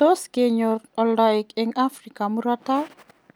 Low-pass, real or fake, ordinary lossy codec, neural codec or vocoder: none; real; none; none